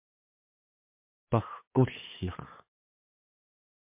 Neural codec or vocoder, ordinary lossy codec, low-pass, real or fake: codec, 16 kHz, 2 kbps, FunCodec, trained on LibriTTS, 25 frames a second; MP3, 32 kbps; 3.6 kHz; fake